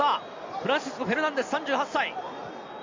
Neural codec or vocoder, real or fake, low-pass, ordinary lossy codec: none; real; 7.2 kHz; MP3, 64 kbps